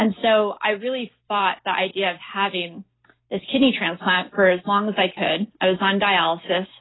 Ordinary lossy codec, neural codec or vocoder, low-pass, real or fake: AAC, 16 kbps; none; 7.2 kHz; real